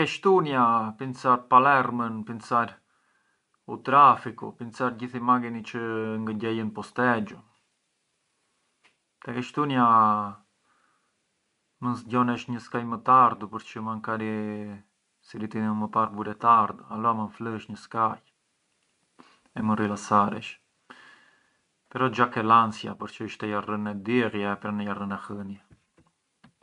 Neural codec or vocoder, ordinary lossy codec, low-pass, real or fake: none; none; 10.8 kHz; real